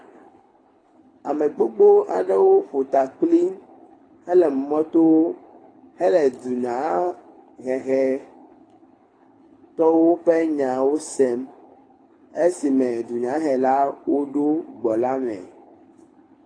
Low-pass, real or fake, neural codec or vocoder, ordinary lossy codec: 9.9 kHz; fake; codec, 24 kHz, 6 kbps, HILCodec; AAC, 32 kbps